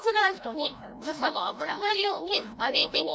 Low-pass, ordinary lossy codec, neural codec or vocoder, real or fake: none; none; codec, 16 kHz, 0.5 kbps, FreqCodec, larger model; fake